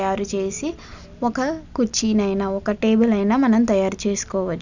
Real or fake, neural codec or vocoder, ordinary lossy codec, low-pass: real; none; none; 7.2 kHz